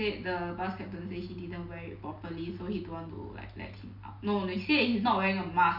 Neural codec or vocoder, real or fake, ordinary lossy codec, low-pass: none; real; none; 5.4 kHz